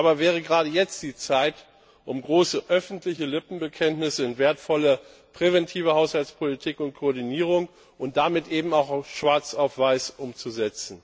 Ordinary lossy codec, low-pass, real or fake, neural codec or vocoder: none; none; real; none